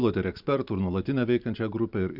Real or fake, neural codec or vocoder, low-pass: real; none; 5.4 kHz